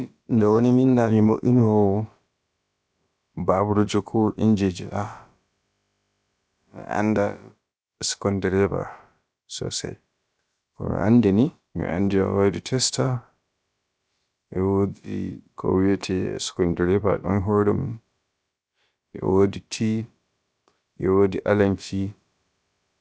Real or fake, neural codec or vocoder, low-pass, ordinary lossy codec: fake; codec, 16 kHz, about 1 kbps, DyCAST, with the encoder's durations; none; none